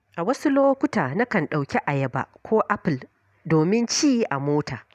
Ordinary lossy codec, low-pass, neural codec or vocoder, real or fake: none; 14.4 kHz; none; real